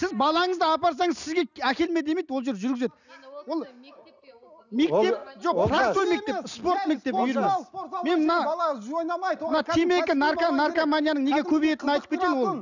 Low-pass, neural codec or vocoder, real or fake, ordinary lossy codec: 7.2 kHz; none; real; none